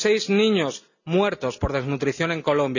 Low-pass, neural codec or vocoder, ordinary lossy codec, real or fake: 7.2 kHz; none; none; real